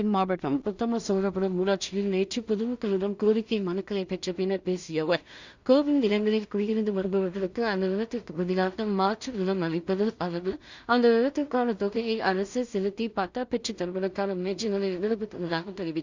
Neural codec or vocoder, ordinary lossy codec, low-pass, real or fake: codec, 16 kHz in and 24 kHz out, 0.4 kbps, LongCat-Audio-Codec, two codebook decoder; Opus, 64 kbps; 7.2 kHz; fake